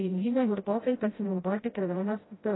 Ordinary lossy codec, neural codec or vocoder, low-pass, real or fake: AAC, 16 kbps; codec, 16 kHz, 0.5 kbps, FreqCodec, smaller model; 7.2 kHz; fake